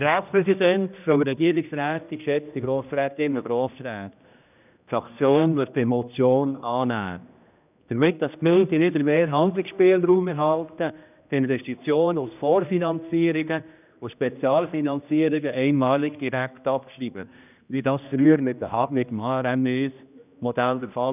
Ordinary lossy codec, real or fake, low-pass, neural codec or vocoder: none; fake; 3.6 kHz; codec, 16 kHz, 1 kbps, X-Codec, HuBERT features, trained on general audio